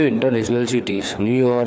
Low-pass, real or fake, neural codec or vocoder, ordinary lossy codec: none; fake; codec, 16 kHz, 4 kbps, FreqCodec, larger model; none